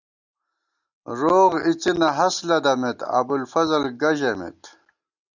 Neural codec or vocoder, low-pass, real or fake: none; 7.2 kHz; real